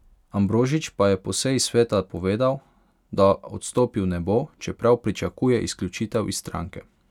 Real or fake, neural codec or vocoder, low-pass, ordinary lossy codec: real; none; 19.8 kHz; none